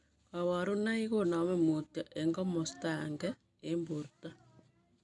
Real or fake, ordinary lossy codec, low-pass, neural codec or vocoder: real; none; 10.8 kHz; none